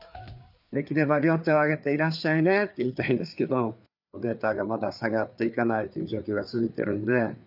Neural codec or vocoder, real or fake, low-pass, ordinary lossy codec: codec, 16 kHz, 4 kbps, FreqCodec, larger model; fake; 5.4 kHz; none